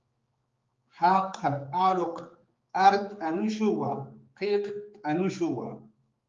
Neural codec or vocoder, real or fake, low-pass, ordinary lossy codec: codec, 16 kHz, 4 kbps, X-Codec, HuBERT features, trained on general audio; fake; 7.2 kHz; Opus, 32 kbps